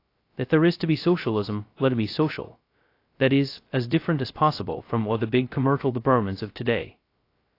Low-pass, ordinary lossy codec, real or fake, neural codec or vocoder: 5.4 kHz; AAC, 32 kbps; fake; codec, 16 kHz, 0.2 kbps, FocalCodec